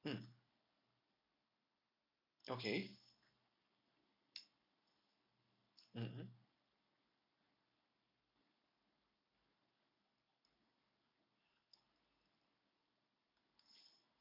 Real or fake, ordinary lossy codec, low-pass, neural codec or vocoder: real; MP3, 32 kbps; 5.4 kHz; none